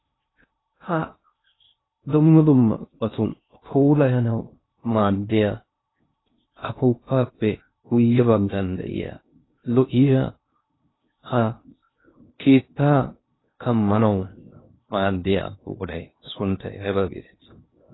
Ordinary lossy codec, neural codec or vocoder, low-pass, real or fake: AAC, 16 kbps; codec, 16 kHz in and 24 kHz out, 0.6 kbps, FocalCodec, streaming, 2048 codes; 7.2 kHz; fake